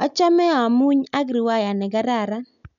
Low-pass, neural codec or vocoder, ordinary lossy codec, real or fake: 7.2 kHz; none; none; real